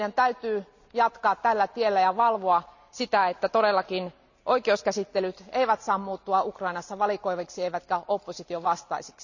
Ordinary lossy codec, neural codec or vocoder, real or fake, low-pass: none; none; real; 7.2 kHz